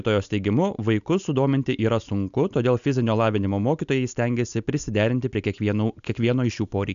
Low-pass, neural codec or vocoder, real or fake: 7.2 kHz; none; real